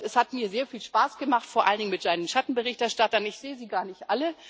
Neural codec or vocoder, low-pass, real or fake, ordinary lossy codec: none; none; real; none